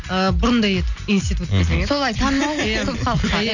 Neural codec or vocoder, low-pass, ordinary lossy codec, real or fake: none; 7.2 kHz; none; real